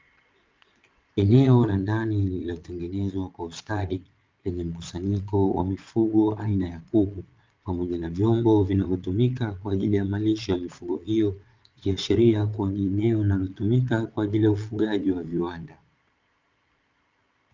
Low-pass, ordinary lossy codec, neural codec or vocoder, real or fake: 7.2 kHz; Opus, 24 kbps; vocoder, 22.05 kHz, 80 mel bands, Vocos; fake